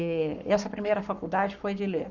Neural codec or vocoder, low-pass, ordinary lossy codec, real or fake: codec, 44.1 kHz, 7.8 kbps, Pupu-Codec; 7.2 kHz; none; fake